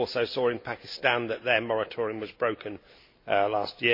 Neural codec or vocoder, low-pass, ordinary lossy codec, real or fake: none; 5.4 kHz; MP3, 32 kbps; real